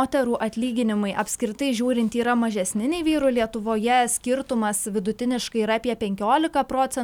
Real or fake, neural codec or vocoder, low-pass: real; none; 19.8 kHz